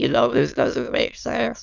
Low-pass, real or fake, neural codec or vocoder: 7.2 kHz; fake; autoencoder, 22.05 kHz, a latent of 192 numbers a frame, VITS, trained on many speakers